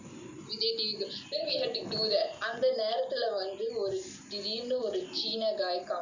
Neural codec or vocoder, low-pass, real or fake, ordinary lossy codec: none; 7.2 kHz; real; Opus, 64 kbps